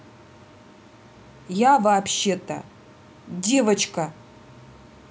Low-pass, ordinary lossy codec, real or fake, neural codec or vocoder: none; none; real; none